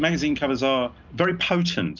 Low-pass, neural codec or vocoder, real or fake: 7.2 kHz; none; real